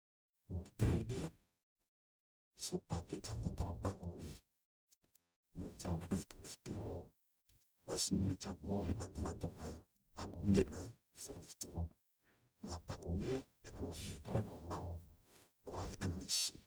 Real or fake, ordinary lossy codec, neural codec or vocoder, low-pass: fake; none; codec, 44.1 kHz, 0.9 kbps, DAC; none